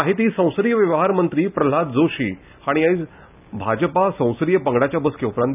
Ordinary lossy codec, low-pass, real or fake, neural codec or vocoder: none; 3.6 kHz; real; none